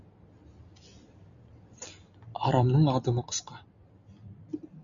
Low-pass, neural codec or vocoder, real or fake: 7.2 kHz; none; real